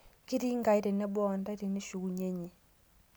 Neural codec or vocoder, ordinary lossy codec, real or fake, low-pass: none; none; real; none